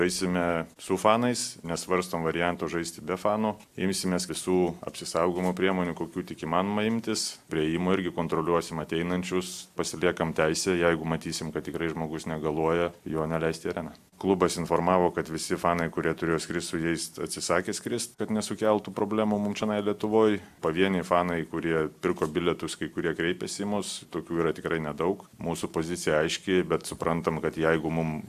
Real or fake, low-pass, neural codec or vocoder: real; 14.4 kHz; none